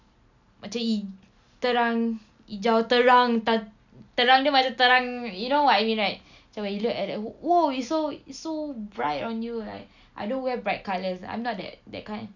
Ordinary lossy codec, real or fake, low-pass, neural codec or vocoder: none; real; 7.2 kHz; none